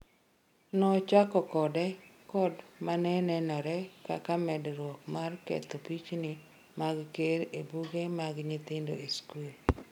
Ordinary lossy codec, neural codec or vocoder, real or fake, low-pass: none; none; real; 19.8 kHz